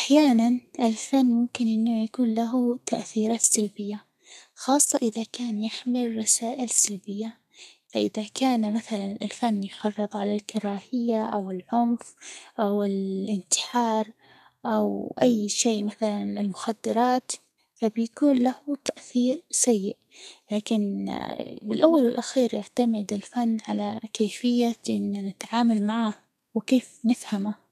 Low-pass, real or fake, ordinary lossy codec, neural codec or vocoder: 14.4 kHz; fake; none; codec, 32 kHz, 1.9 kbps, SNAC